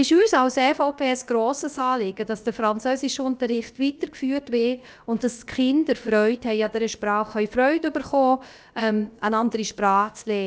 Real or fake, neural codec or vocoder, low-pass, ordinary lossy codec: fake; codec, 16 kHz, about 1 kbps, DyCAST, with the encoder's durations; none; none